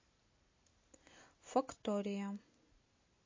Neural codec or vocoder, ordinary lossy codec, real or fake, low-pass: none; MP3, 32 kbps; real; 7.2 kHz